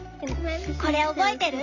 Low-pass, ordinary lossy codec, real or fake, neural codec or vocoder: 7.2 kHz; none; real; none